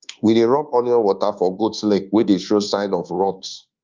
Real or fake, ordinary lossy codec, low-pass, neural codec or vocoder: fake; Opus, 32 kbps; 7.2 kHz; codec, 24 kHz, 1.2 kbps, DualCodec